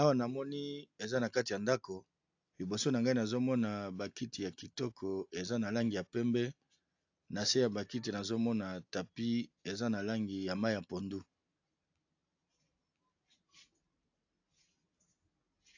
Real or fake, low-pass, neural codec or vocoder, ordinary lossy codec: real; 7.2 kHz; none; AAC, 48 kbps